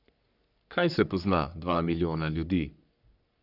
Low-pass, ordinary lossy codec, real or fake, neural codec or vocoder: 5.4 kHz; none; fake; codec, 44.1 kHz, 3.4 kbps, Pupu-Codec